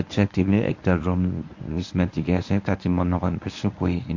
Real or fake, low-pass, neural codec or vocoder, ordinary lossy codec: fake; 7.2 kHz; codec, 24 kHz, 0.9 kbps, WavTokenizer, medium speech release version 1; AAC, 48 kbps